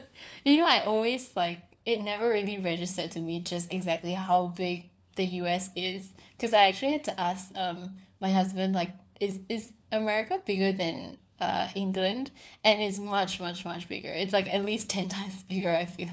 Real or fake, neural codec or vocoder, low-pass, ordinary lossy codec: fake; codec, 16 kHz, 4 kbps, FunCodec, trained on LibriTTS, 50 frames a second; none; none